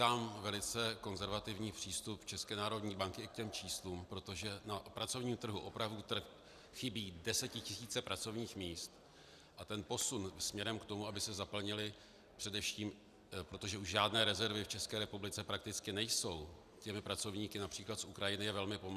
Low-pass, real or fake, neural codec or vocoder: 14.4 kHz; real; none